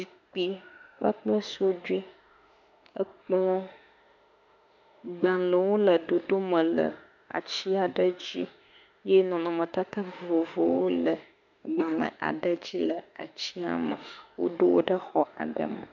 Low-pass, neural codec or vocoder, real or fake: 7.2 kHz; autoencoder, 48 kHz, 32 numbers a frame, DAC-VAE, trained on Japanese speech; fake